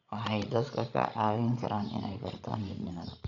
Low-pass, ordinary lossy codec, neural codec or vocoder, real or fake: 7.2 kHz; none; codec, 16 kHz, 16 kbps, FreqCodec, larger model; fake